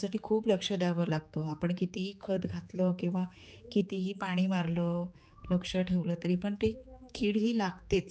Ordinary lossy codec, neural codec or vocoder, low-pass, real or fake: none; codec, 16 kHz, 4 kbps, X-Codec, HuBERT features, trained on general audio; none; fake